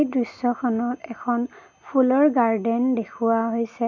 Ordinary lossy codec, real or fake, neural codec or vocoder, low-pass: none; real; none; 7.2 kHz